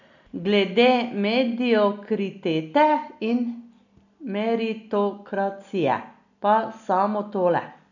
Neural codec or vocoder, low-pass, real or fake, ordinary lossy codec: none; 7.2 kHz; real; none